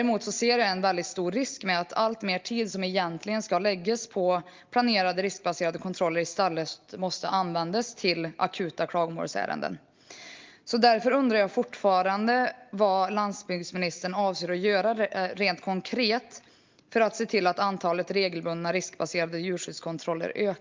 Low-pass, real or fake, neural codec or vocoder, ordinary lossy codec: 7.2 kHz; real; none; Opus, 32 kbps